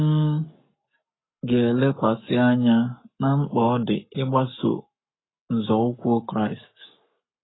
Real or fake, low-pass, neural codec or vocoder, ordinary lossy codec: fake; 7.2 kHz; codec, 44.1 kHz, 7.8 kbps, Pupu-Codec; AAC, 16 kbps